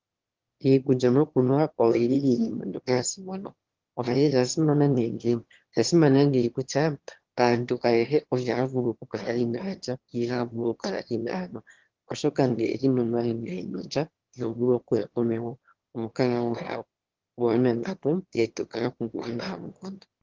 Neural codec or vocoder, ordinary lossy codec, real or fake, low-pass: autoencoder, 22.05 kHz, a latent of 192 numbers a frame, VITS, trained on one speaker; Opus, 16 kbps; fake; 7.2 kHz